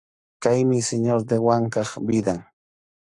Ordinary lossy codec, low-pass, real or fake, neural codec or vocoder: AAC, 64 kbps; 10.8 kHz; fake; codec, 44.1 kHz, 7.8 kbps, Pupu-Codec